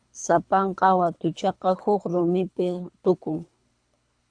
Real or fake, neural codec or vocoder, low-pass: fake; codec, 24 kHz, 3 kbps, HILCodec; 9.9 kHz